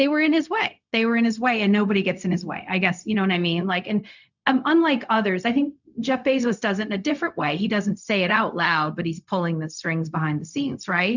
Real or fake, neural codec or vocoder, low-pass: fake; codec, 16 kHz, 0.4 kbps, LongCat-Audio-Codec; 7.2 kHz